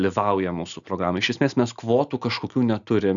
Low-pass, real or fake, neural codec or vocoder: 7.2 kHz; real; none